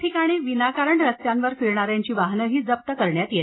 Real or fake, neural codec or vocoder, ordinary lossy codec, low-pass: real; none; AAC, 16 kbps; 7.2 kHz